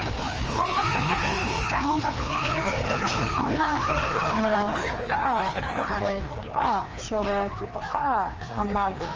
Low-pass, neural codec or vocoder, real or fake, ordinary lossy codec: 7.2 kHz; codec, 16 kHz, 2 kbps, FreqCodec, larger model; fake; Opus, 24 kbps